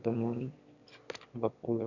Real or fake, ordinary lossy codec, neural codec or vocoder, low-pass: fake; none; autoencoder, 22.05 kHz, a latent of 192 numbers a frame, VITS, trained on one speaker; 7.2 kHz